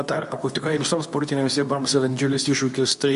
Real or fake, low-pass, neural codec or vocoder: fake; 10.8 kHz; codec, 24 kHz, 0.9 kbps, WavTokenizer, medium speech release version 2